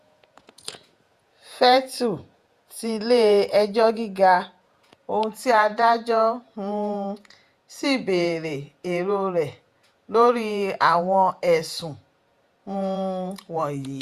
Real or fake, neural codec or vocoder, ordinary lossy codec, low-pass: fake; vocoder, 48 kHz, 128 mel bands, Vocos; Opus, 64 kbps; 14.4 kHz